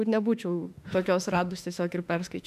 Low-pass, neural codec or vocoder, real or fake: 14.4 kHz; autoencoder, 48 kHz, 32 numbers a frame, DAC-VAE, trained on Japanese speech; fake